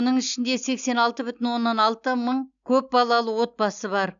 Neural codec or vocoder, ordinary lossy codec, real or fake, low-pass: none; none; real; 7.2 kHz